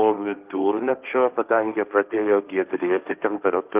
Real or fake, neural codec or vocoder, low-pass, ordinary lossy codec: fake; codec, 16 kHz, 1.1 kbps, Voila-Tokenizer; 3.6 kHz; Opus, 24 kbps